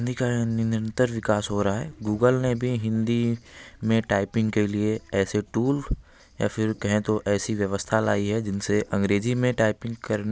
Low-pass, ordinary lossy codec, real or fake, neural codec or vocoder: none; none; real; none